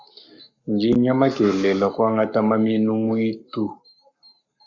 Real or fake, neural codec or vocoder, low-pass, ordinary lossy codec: fake; codec, 44.1 kHz, 7.8 kbps, Pupu-Codec; 7.2 kHz; Opus, 64 kbps